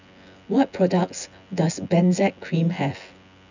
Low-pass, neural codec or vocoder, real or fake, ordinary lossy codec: 7.2 kHz; vocoder, 24 kHz, 100 mel bands, Vocos; fake; none